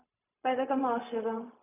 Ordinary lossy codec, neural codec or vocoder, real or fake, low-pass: none; codec, 16 kHz, 0.4 kbps, LongCat-Audio-Codec; fake; 3.6 kHz